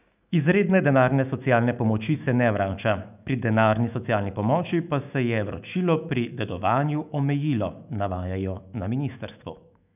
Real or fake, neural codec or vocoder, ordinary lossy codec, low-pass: fake; autoencoder, 48 kHz, 128 numbers a frame, DAC-VAE, trained on Japanese speech; none; 3.6 kHz